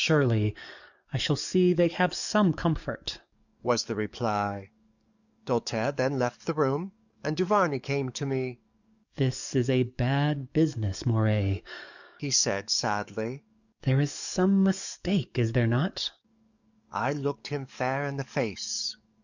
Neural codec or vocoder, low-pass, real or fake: codec, 44.1 kHz, 7.8 kbps, DAC; 7.2 kHz; fake